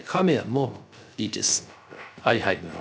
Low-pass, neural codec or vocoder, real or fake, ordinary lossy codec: none; codec, 16 kHz, 0.3 kbps, FocalCodec; fake; none